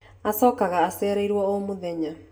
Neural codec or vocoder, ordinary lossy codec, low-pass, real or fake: none; none; none; real